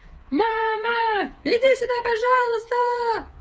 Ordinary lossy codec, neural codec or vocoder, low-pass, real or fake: none; codec, 16 kHz, 4 kbps, FreqCodec, smaller model; none; fake